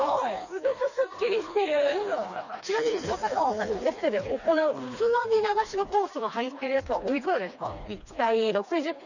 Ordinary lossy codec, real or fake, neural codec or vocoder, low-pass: none; fake; codec, 16 kHz, 2 kbps, FreqCodec, smaller model; 7.2 kHz